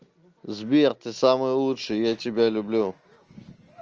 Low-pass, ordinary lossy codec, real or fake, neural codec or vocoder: 7.2 kHz; Opus, 24 kbps; real; none